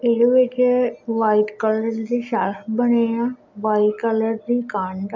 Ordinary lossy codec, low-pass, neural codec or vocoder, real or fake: none; 7.2 kHz; none; real